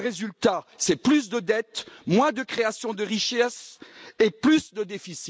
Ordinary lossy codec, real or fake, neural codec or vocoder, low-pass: none; real; none; none